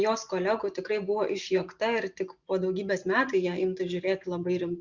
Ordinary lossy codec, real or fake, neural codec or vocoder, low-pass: Opus, 64 kbps; real; none; 7.2 kHz